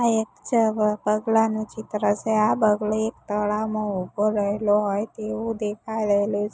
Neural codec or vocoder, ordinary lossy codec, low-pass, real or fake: none; none; none; real